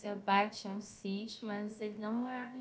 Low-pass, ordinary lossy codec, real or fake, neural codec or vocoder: none; none; fake; codec, 16 kHz, about 1 kbps, DyCAST, with the encoder's durations